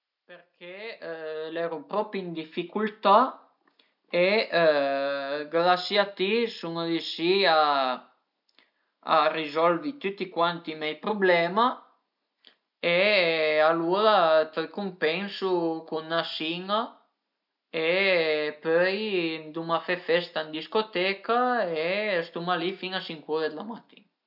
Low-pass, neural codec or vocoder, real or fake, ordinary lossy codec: 5.4 kHz; none; real; none